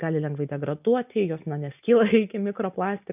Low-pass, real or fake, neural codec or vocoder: 3.6 kHz; real; none